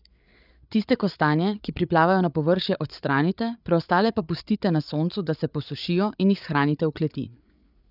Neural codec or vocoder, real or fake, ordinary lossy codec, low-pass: codec, 16 kHz, 8 kbps, FreqCodec, larger model; fake; none; 5.4 kHz